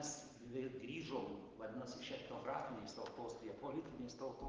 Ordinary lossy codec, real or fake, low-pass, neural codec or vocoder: Opus, 16 kbps; real; 7.2 kHz; none